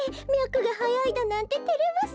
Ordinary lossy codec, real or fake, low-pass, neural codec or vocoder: none; real; none; none